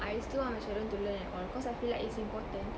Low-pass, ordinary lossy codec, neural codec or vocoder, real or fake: none; none; none; real